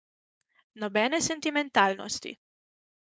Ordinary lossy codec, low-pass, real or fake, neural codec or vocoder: none; none; fake; codec, 16 kHz, 4.8 kbps, FACodec